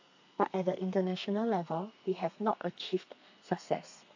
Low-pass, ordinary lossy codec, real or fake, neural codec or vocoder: 7.2 kHz; AAC, 48 kbps; fake; codec, 32 kHz, 1.9 kbps, SNAC